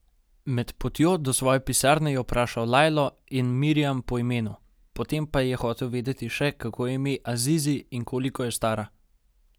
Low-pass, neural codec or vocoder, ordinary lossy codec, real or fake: none; none; none; real